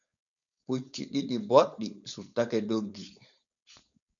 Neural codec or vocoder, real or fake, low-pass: codec, 16 kHz, 4.8 kbps, FACodec; fake; 7.2 kHz